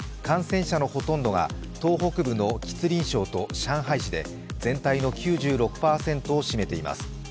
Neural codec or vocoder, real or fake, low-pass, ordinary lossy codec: none; real; none; none